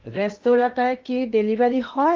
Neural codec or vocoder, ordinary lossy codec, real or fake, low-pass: codec, 16 kHz in and 24 kHz out, 0.8 kbps, FocalCodec, streaming, 65536 codes; Opus, 24 kbps; fake; 7.2 kHz